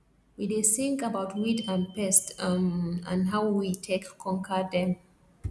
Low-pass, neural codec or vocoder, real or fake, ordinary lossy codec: none; none; real; none